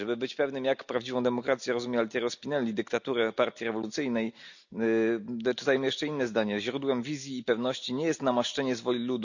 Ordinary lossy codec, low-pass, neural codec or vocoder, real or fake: none; 7.2 kHz; none; real